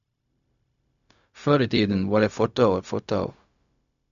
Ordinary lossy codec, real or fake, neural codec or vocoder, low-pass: none; fake; codec, 16 kHz, 0.4 kbps, LongCat-Audio-Codec; 7.2 kHz